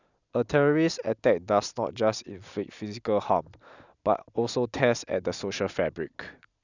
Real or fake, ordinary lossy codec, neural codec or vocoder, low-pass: real; none; none; 7.2 kHz